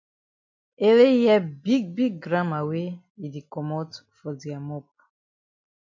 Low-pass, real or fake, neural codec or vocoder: 7.2 kHz; real; none